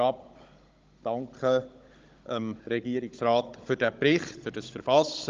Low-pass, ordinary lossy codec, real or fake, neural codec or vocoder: 7.2 kHz; Opus, 24 kbps; fake; codec, 16 kHz, 16 kbps, FunCodec, trained on Chinese and English, 50 frames a second